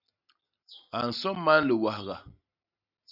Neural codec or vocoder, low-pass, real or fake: none; 5.4 kHz; real